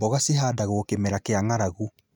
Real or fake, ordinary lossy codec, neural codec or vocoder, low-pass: real; none; none; none